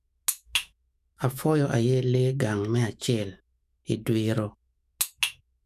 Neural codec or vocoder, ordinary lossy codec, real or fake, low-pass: autoencoder, 48 kHz, 128 numbers a frame, DAC-VAE, trained on Japanese speech; none; fake; 14.4 kHz